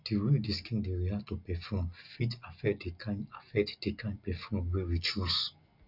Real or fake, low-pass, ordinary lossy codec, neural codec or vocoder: real; 5.4 kHz; none; none